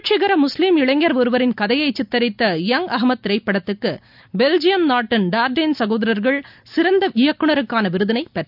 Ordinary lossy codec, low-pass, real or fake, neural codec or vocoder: none; 5.4 kHz; real; none